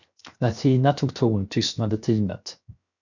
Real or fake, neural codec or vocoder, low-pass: fake; codec, 16 kHz, 0.7 kbps, FocalCodec; 7.2 kHz